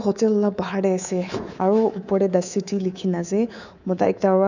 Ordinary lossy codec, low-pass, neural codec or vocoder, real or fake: none; 7.2 kHz; codec, 24 kHz, 3.1 kbps, DualCodec; fake